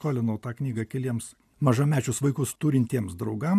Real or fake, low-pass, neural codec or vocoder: real; 14.4 kHz; none